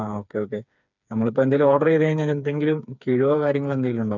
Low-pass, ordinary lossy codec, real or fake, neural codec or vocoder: 7.2 kHz; none; fake; codec, 16 kHz, 4 kbps, FreqCodec, smaller model